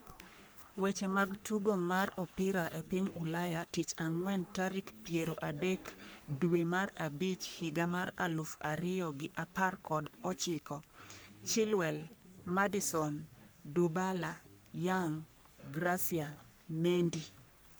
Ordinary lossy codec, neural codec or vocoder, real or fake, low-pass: none; codec, 44.1 kHz, 3.4 kbps, Pupu-Codec; fake; none